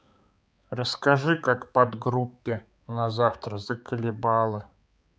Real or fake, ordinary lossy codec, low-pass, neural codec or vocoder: fake; none; none; codec, 16 kHz, 4 kbps, X-Codec, HuBERT features, trained on balanced general audio